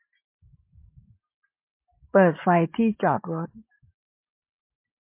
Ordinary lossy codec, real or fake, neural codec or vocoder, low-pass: MP3, 24 kbps; real; none; 3.6 kHz